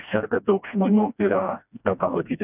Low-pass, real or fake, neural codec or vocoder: 3.6 kHz; fake; codec, 16 kHz, 1 kbps, FreqCodec, smaller model